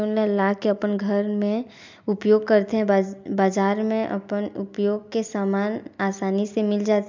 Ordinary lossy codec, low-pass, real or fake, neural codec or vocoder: MP3, 64 kbps; 7.2 kHz; real; none